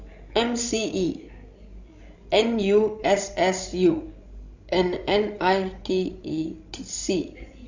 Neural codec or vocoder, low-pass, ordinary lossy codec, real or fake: codec, 16 kHz, 16 kbps, FreqCodec, larger model; 7.2 kHz; Opus, 64 kbps; fake